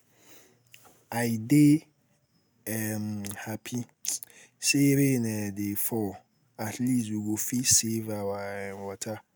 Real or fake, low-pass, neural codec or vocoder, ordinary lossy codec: real; none; none; none